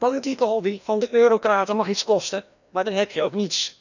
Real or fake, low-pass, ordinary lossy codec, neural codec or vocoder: fake; 7.2 kHz; none; codec, 16 kHz, 1 kbps, FreqCodec, larger model